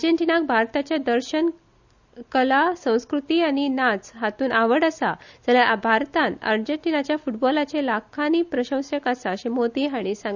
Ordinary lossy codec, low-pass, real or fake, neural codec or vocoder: none; 7.2 kHz; real; none